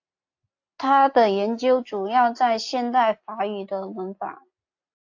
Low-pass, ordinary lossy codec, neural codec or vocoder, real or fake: 7.2 kHz; AAC, 48 kbps; none; real